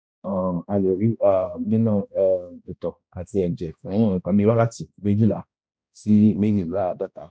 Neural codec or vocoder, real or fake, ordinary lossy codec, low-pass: codec, 16 kHz, 1 kbps, X-Codec, HuBERT features, trained on balanced general audio; fake; none; none